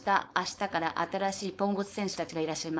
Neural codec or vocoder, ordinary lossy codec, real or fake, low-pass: codec, 16 kHz, 4.8 kbps, FACodec; none; fake; none